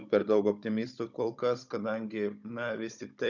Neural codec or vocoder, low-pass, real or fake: codec, 16 kHz, 16 kbps, FunCodec, trained on Chinese and English, 50 frames a second; 7.2 kHz; fake